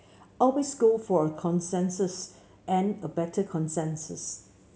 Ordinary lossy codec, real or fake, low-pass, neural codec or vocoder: none; real; none; none